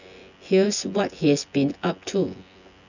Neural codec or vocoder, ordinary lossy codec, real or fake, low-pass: vocoder, 24 kHz, 100 mel bands, Vocos; none; fake; 7.2 kHz